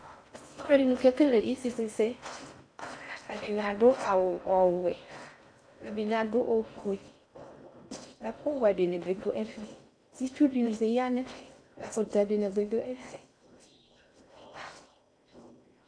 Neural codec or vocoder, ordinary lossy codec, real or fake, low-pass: codec, 16 kHz in and 24 kHz out, 0.6 kbps, FocalCodec, streaming, 4096 codes; AAC, 64 kbps; fake; 9.9 kHz